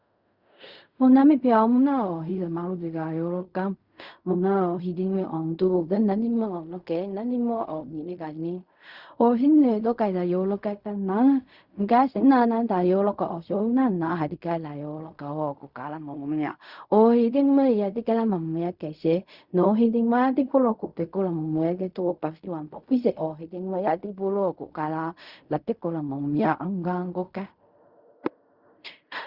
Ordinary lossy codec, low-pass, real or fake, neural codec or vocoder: Opus, 64 kbps; 5.4 kHz; fake; codec, 16 kHz in and 24 kHz out, 0.4 kbps, LongCat-Audio-Codec, fine tuned four codebook decoder